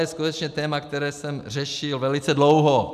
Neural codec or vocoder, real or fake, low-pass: none; real; 14.4 kHz